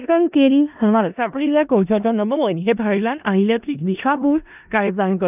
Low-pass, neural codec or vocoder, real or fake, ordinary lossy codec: 3.6 kHz; codec, 16 kHz in and 24 kHz out, 0.4 kbps, LongCat-Audio-Codec, four codebook decoder; fake; none